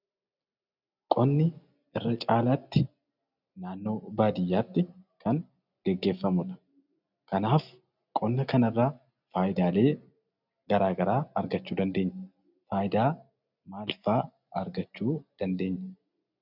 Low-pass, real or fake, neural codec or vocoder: 5.4 kHz; real; none